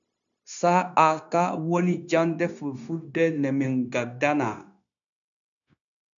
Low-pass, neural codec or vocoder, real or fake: 7.2 kHz; codec, 16 kHz, 0.9 kbps, LongCat-Audio-Codec; fake